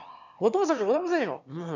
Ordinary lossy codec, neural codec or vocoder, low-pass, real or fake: none; autoencoder, 22.05 kHz, a latent of 192 numbers a frame, VITS, trained on one speaker; 7.2 kHz; fake